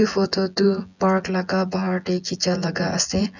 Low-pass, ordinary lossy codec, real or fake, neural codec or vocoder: 7.2 kHz; none; fake; vocoder, 24 kHz, 100 mel bands, Vocos